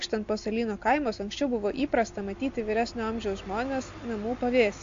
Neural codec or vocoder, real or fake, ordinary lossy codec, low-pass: none; real; AAC, 48 kbps; 7.2 kHz